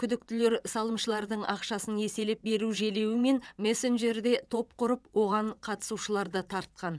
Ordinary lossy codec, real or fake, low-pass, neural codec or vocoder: none; fake; none; vocoder, 22.05 kHz, 80 mel bands, WaveNeXt